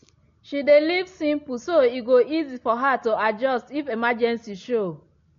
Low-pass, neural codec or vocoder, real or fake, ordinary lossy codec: 7.2 kHz; none; real; AAC, 48 kbps